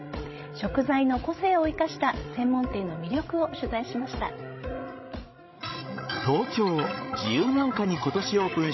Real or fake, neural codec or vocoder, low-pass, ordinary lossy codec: fake; codec, 16 kHz, 16 kbps, FreqCodec, larger model; 7.2 kHz; MP3, 24 kbps